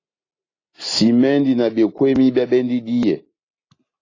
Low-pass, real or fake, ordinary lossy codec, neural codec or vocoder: 7.2 kHz; real; AAC, 32 kbps; none